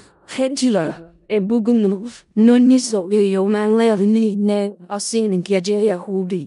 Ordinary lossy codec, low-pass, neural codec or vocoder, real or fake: none; 10.8 kHz; codec, 16 kHz in and 24 kHz out, 0.4 kbps, LongCat-Audio-Codec, four codebook decoder; fake